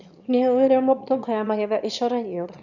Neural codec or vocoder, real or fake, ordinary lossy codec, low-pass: autoencoder, 22.05 kHz, a latent of 192 numbers a frame, VITS, trained on one speaker; fake; none; 7.2 kHz